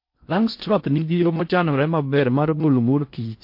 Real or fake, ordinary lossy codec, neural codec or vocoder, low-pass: fake; MP3, 32 kbps; codec, 16 kHz in and 24 kHz out, 0.6 kbps, FocalCodec, streaming, 4096 codes; 5.4 kHz